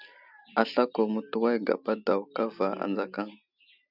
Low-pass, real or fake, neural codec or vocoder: 5.4 kHz; real; none